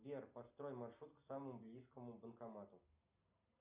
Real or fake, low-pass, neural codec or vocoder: real; 3.6 kHz; none